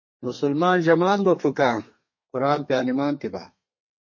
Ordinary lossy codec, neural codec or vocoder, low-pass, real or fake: MP3, 32 kbps; codec, 32 kHz, 1.9 kbps, SNAC; 7.2 kHz; fake